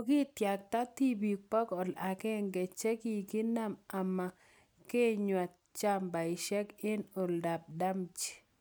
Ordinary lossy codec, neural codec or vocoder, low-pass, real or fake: none; none; none; real